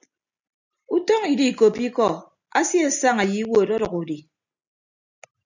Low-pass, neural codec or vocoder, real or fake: 7.2 kHz; none; real